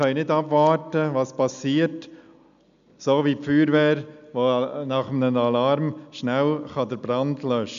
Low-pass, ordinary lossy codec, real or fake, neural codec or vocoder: 7.2 kHz; none; real; none